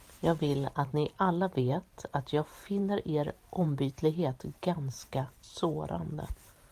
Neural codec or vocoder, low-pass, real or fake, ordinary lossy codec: vocoder, 44.1 kHz, 128 mel bands every 512 samples, BigVGAN v2; 14.4 kHz; fake; Opus, 32 kbps